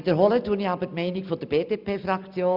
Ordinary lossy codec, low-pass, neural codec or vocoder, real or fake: none; 5.4 kHz; none; real